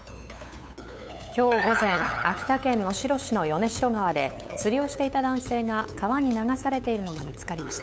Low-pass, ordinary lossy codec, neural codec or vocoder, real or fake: none; none; codec, 16 kHz, 8 kbps, FunCodec, trained on LibriTTS, 25 frames a second; fake